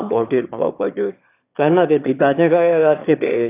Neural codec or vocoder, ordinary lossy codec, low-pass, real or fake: autoencoder, 22.05 kHz, a latent of 192 numbers a frame, VITS, trained on one speaker; AAC, 24 kbps; 3.6 kHz; fake